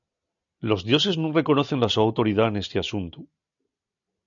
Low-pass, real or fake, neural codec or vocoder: 7.2 kHz; real; none